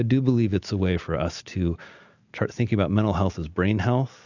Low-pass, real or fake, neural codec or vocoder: 7.2 kHz; real; none